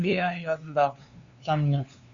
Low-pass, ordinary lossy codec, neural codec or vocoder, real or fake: 7.2 kHz; Opus, 64 kbps; codec, 16 kHz, 2 kbps, FunCodec, trained on LibriTTS, 25 frames a second; fake